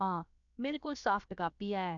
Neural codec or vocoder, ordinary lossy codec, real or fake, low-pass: codec, 16 kHz, about 1 kbps, DyCAST, with the encoder's durations; none; fake; 7.2 kHz